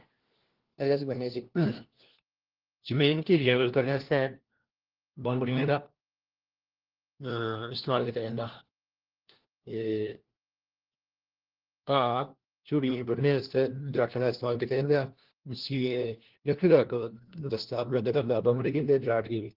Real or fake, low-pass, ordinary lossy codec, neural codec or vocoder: fake; 5.4 kHz; Opus, 16 kbps; codec, 16 kHz, 1 kbps, FunCodec, trained on LibriTTS, 50 frames a second